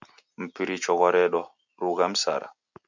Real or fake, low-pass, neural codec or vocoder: real; 7.2 kHz; none